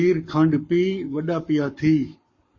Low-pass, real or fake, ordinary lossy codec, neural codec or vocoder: 7.2 kHz; fake; MP3, 32 kbps; codec, 44.1 kHz, 7.8 kbps, Pupu-Codec